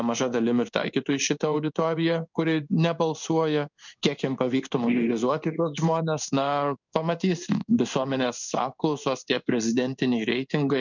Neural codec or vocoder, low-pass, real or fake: codec, 16 kHz in and 24 kHz out, 1 kbps, XY-Tokenizer; 7.2 kHz; fake